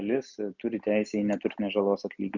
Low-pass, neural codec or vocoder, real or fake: 7.2 kHz; none; real